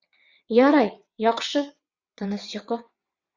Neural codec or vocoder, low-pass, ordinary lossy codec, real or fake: vocoder, 22.05 kHz, 80 mel bands, WaveNeXt; 7.2 kHz; Opus, 64 kbps; fake